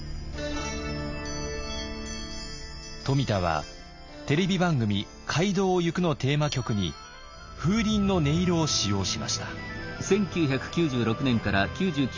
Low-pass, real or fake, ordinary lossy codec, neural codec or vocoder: 7.2 kHz; real; MP3, 64 kbps; none